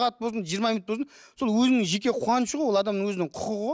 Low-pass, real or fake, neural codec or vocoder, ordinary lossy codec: none; real; none; none